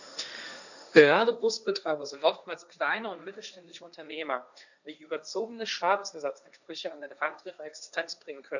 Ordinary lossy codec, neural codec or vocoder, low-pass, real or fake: none; codec, 16 kHz, 1.1 kbps, Voila-Tokenizer; 7.2 kHz; fake